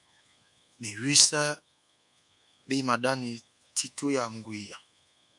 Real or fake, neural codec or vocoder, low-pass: fake; codec, 24 kHz, 1.2 kbps, DualCodec; 10.8 kHz